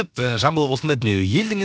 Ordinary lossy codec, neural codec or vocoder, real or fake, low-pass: none; codec, 16 kHz, about 1 kbps, DyCAST, with the encoder's durations; fake; none